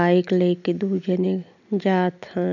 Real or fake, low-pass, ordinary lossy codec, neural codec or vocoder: real; 7.2 kHz; none; none